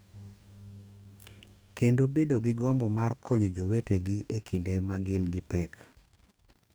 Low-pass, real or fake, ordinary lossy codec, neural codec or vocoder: none; fake; none; codec, 44.1 kHz, 2.6 kbps, DAC